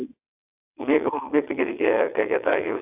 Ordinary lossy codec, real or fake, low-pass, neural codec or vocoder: AAC, 32 kbps; fake; 3.6 kHz; vocoder, 22.05 kHz, 80 mel bands, WaveNeXt